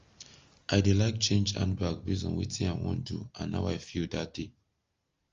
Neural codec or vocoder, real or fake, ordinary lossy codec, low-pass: none; real; Opus, 32 kbps; 7.2 kHz